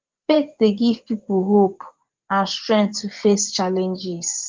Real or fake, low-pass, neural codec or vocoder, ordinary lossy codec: fake; 7.2 kHz; vocoder, 24 kHz, 100 mel bands, Vocos; Opus, 16 kbps